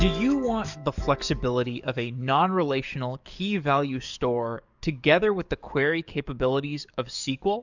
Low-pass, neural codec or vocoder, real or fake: 7.2 kHz; codec, 44.1 kHz, 7.8 kbps, DAC; fake